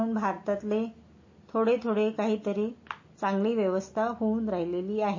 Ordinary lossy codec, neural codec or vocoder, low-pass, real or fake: MP3, 32 kbps; none; 7.2 kHz; real